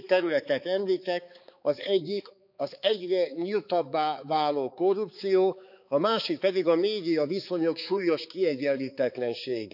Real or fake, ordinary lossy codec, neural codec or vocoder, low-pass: fake; none; codec, 16 kHz, 4 kbps, X-Codec, HuBERT features, trained on balanced general audio; 5.4 kHz